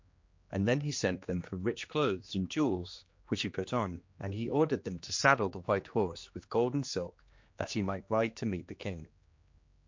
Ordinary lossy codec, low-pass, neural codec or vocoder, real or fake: MP3, 48 kbps; 7.2 kHz; codec, 16 kHz, 2 kbps, X-Codec, HuBERT features, trained on general audio; fake